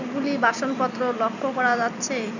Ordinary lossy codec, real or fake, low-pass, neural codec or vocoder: none; real; 7.2 kHz; none